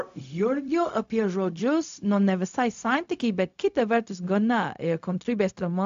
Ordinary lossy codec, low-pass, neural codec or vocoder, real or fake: AAC, 64 kbps; 7.2 kHz; codec, 16 kHz, 0.4 kbps, LongCat-Audio-Codec; fake